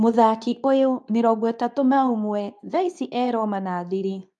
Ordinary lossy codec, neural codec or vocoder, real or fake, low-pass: none; codec, 24 kHz, 0.9 kbps, WavTokenizer, medium speech release version 2; fake; none